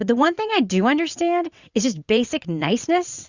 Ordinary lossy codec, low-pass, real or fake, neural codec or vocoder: Opus, 64 kbps; 7.2 kHz; fake; vocoder, 22.05 kHz, 80 mel bands, WaveNeXt